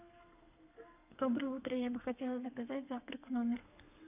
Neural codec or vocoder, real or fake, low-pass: codec, 32 kHz, 1.9 kbps, SNAC; fake; 3.6 kHz